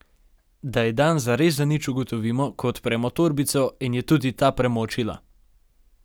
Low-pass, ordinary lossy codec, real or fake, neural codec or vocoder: none; none; real; none